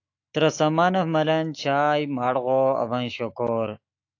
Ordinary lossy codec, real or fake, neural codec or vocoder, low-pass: AAC, 48 kbps; fake; autoencoder, 48 kHz, 128 numbers a frame, DAC-VAE, trained on Japanese speech; 7.2 kHz